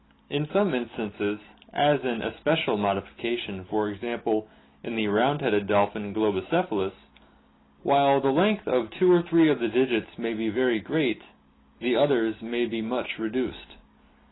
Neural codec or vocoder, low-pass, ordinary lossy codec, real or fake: none; 7.2 kHz; AAC, 16 kbps; real